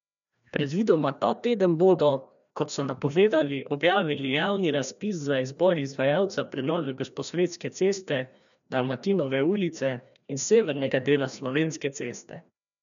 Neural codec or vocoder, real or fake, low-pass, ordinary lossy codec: codec, 16 kHz, 1 kbps, FreqCodec, larger model; fake; 7.2 kHz; none